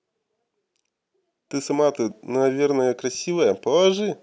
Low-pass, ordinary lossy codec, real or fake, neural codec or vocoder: none; none; real; none